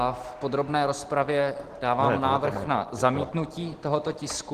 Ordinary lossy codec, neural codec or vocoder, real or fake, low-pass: Opus, 16 kbps; none; real; 14.4 kHz